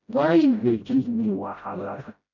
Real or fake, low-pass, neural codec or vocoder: fake; 7.2 kHz; codec, 16 kHz, 0.5 kbps, FreqCodec, smaller model